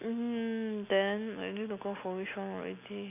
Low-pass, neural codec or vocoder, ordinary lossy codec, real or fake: 3.6 kHz; none; none; real